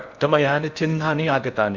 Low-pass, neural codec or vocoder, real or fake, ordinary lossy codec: 7.2 kHz; codec, 16 kHz in and 24 kHz out, 0.6 kbps, FocalCodec, streaming, 4096 codes; fake; none